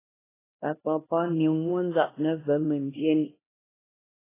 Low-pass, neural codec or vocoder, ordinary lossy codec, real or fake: 3.6 kHz; codec, 16 kHz, 1 kbps, X-Codec, HuBERT features, trained on LibriSpeech; AAC, 16 kbps; fake